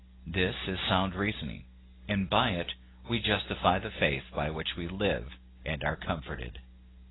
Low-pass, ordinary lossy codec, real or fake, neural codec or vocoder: 7.2 kHz; AAC, 16 kbps; real; none